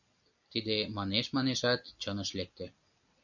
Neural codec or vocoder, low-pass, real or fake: none; 7.2 kHz; real